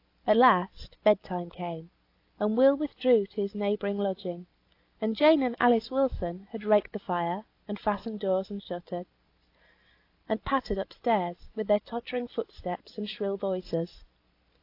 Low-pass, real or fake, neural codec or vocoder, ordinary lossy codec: 5.4 kHz; real; none; AAC, 32 kbps